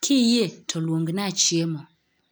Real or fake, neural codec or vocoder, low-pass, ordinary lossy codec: real; none; none; none